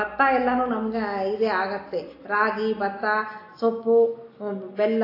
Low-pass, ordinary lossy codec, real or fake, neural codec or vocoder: 5.4 kHz; AAC, 32 kbps; real; none